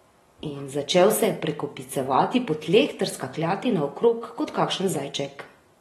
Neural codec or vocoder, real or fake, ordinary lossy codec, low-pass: none; real; AAC, 32 kbps; 19.8 kHz